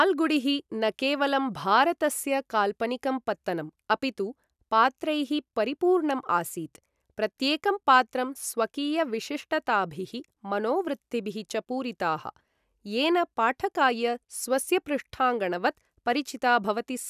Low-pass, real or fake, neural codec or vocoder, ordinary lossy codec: 14.4 kHz; real; none; none